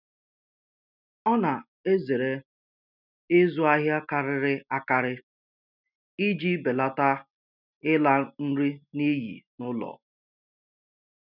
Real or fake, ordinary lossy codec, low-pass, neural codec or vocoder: real; none; 5.4 kHz; none